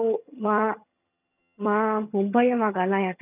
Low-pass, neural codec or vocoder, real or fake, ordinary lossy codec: 3.6 kHz; vocoder, 22.05 kHz, 80 mel bands, HiFi-GAN; fake; none